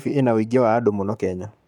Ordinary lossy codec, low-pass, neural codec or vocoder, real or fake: none; 19.8 kHz; codec, 44.1 kHz, 7.8 kbps, Pupu-Codec; fake